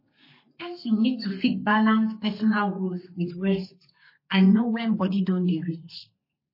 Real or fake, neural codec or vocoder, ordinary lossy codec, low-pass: fake; codec, 32 kHz, 1.9 kbps, SNAC; MP3, 24 kbps; 5.4 kHz